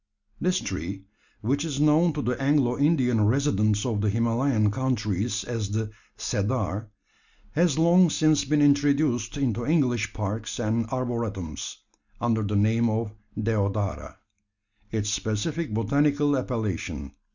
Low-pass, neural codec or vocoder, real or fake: 7.2 kHz; none; real